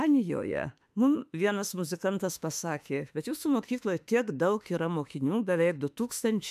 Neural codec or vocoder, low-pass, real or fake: autoencoder, 48 kHz, 32 numbers a frame, DAC-VAE, trained on Japanese speech; 14.4 kHz; fake